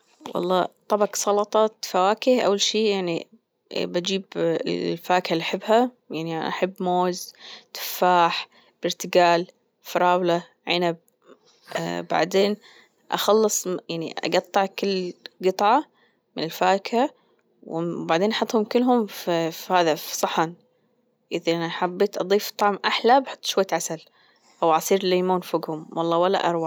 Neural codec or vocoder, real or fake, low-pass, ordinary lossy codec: none; real; none; none